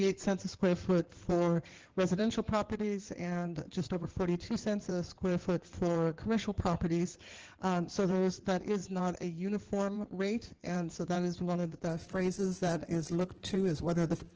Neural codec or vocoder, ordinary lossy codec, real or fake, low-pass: codec, 16 kHz in and 24 kHz out, 2.2 kbps, FireRedTTS-2 codec; Opus, 16 kbps; fake; 7.2 kHz